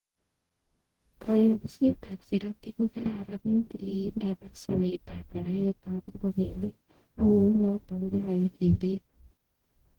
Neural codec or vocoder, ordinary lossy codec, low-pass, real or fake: codec, 44.1 kHz, 0.9 kbps, DAC; Opus, 16 kbps; 19.8 kHz; fake